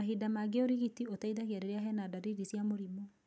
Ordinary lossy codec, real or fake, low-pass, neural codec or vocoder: none; real; none; none